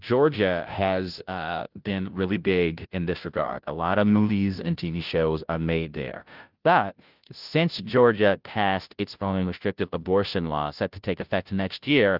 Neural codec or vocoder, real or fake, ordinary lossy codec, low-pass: codec, 16 kHz, 0.5 kbps, FunCodec, trained on Chinese and English, 25 frames a second; fake; Opus, 24 kbps; 5.4 kHz